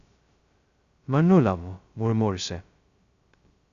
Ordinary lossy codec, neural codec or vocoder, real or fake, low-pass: Opus, 64 kbps; codec, 16 kHz, 0.2 kbps, FocalCodec; fake; 7.2 kHz